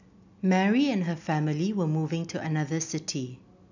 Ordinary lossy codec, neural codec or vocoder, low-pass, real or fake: none; none; 7.2 kHz; real